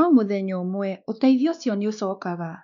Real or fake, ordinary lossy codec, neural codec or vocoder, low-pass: fake; none; codec, 16 kHz, 2 kbps, X-Codec, WavLM features, trained on Multilingual LibriSpeech; 7.2 kHz